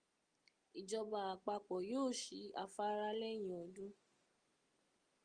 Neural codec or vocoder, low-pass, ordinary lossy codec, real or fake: none; 9.9 kHz; Opus, 24 kbps; real